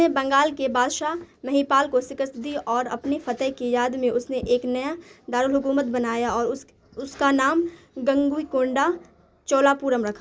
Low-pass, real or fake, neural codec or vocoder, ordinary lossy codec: none; real; none; none